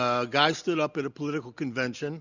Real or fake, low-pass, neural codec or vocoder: real; 7.2 kHz; none